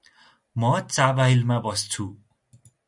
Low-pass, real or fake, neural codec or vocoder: 10.8 kHz; real; none